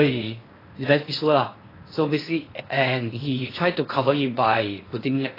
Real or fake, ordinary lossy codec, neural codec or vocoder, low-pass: fake; AAC, 24 kbps; codec, 16 kHz in and 24 kHz out, 0.8 kbps, FocalCodec, streaming, 65536 codes; 5.4 kHz